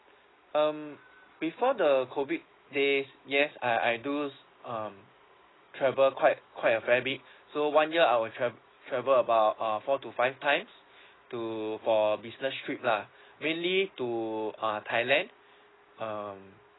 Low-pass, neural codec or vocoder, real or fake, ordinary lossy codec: 7.2 kHz; none; real; AAC, 16 kbps